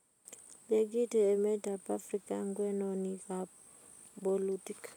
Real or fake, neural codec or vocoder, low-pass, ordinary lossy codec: real; none; 19.8 kHz; none